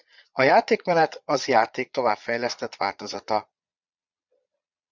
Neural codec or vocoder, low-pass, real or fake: vocoder, 22.05 kHz, 80 mel bands, Vocos; 7.2 kHz; fake